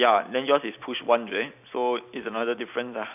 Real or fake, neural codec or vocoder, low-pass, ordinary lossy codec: real; none; 3.6 kHz; none